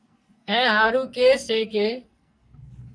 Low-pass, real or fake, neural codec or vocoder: 9.9 kHz; fake; codec, 44.1 kHz, 2.6 kbps, SNAC